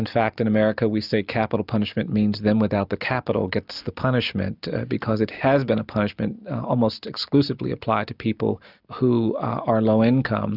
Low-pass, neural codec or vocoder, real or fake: 5.4 kHz; none; real